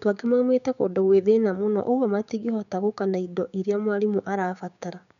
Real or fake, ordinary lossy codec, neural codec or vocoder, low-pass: fake; none; codec, 16 kHz, 6 kbps, DAC; 7.2 kHz